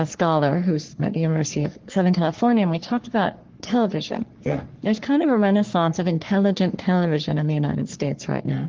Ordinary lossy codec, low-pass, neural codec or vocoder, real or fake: Opus, 16 kbps; 7.2 kHz; codec, 44.1 kHz, 3.4 kbps, Pupu-Codec; fake